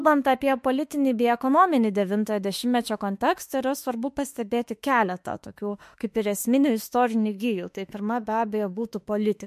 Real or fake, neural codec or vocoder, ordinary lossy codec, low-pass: fake; autoencoder, 48 kHz, 32 numbers a frame, DAC-VAE, trained on Japanese speech; MP3, 64 kbps; 14.4 kHz